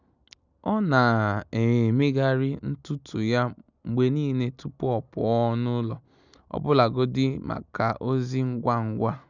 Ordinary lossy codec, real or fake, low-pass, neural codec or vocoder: none; real; 7.2 kHz; none